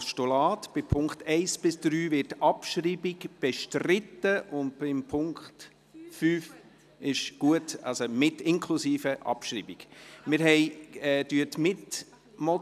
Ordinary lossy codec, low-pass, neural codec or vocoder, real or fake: none; 14.4 kHz; none; real